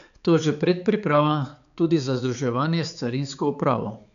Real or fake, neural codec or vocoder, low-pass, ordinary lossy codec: fake; codec, 16 kHz, 4 kbps, X-Codec, HuBERT features, trained on balanced general audio; 7.2 kHz; MP3, 96 kbps